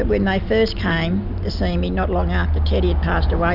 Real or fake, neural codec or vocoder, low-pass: real; none; 5.4 kHz